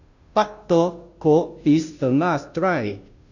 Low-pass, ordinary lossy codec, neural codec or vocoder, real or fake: 7.2 kHz; none; codec, 16 kHz, 0.5 kbps, FunCodec, trained on Chinese and English, 25 frames a second; fake